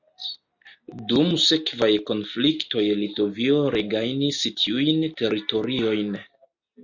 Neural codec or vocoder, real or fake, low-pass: none; real; 7.2 kHz